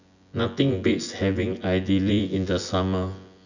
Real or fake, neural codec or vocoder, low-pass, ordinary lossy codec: fake; vocoder, 24 kHz, 100 mel bands, Vocos; 7.2 kHz; none